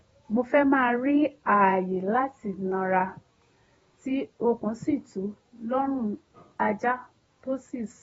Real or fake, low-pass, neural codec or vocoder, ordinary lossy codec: fake; 19.8 kHz; vocoder, 48 kHz, 128 mel bands, Vocos; AAC, 24 kbps